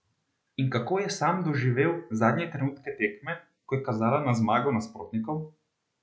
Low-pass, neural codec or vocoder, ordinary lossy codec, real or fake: none; none; none; real